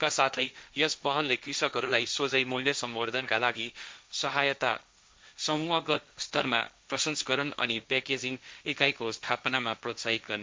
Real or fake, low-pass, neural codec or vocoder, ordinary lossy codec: fake; none; codec, 16 kHz, 1.1 kbps, Voila-Tokenizer; none